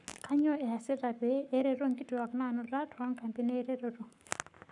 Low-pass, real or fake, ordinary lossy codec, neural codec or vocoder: 10.8 kHz; fake; none; codec, 44.1 kHz, 7.8 kbps, Pupu-Codec